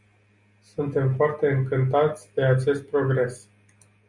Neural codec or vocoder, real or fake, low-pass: none; real; 10.8 kHz